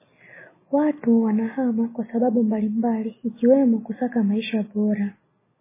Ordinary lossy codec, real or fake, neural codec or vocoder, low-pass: MP3, 16 kbps; real; none; 3.6 kHz